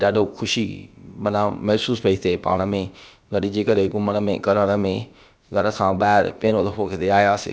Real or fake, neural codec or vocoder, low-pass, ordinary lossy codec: fake; codec, 16 kHz, about 1 kbps, DyCAST, with the encoder's durations; none; none